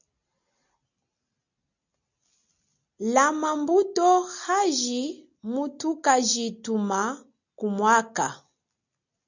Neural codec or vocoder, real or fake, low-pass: none; real; 7.2 kHz